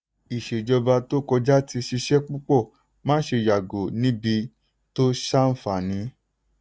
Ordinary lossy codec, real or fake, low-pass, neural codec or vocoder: none; real; none; none